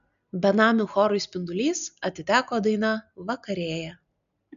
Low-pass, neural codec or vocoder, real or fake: 7.2 kHz; none; real